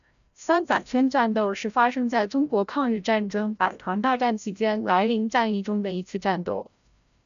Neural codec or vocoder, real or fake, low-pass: codec, 16 kHz, 0.5 kbps, FreqCodec, larger model; fake; 7.2 kHz